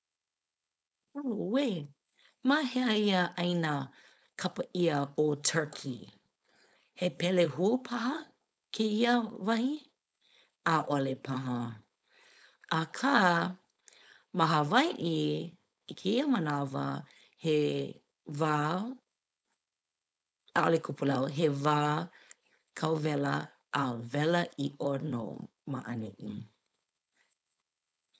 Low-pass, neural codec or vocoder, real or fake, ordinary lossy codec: none; codec, 16 kHz, 4.8 kbps, FACodec; fake; none